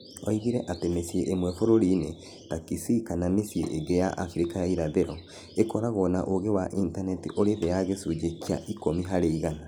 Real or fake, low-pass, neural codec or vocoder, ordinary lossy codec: real; none; none; none